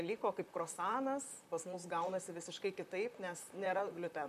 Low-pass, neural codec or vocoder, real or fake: 14.4 kHz; vocoder, 44.1 kHz, 128 mel bands, Pupu-Vocoder; fake